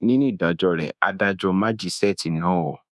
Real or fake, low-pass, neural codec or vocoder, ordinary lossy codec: fake; none; codec, 24 kHz, 1.2 kbps, DualCodec; none